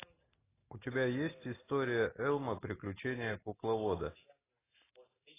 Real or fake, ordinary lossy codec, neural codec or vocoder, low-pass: real; AAC, 16 kbps; none; 3.6 kHz